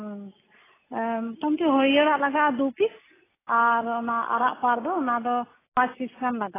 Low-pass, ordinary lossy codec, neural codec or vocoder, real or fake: 3.6 kHz; AAC, 16 kbps; none; real